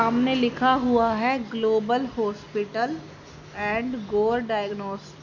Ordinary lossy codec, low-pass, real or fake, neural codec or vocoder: none; 7.2 kHz; real; none